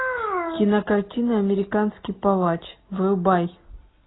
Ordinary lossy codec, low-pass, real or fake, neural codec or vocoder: AAC, 16 kbps; 7.2 kHz; real; none